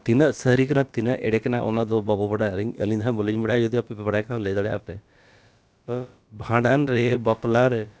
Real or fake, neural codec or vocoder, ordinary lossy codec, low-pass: fake; codec, 16 kHz, about 1 kbps, DyCAST, with the encoder's durations; none; none